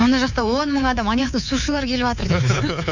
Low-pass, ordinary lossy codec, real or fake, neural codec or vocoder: 7.2 kHz; none; fake; codec, 24 kHz, 3.1 kbps, DualCodec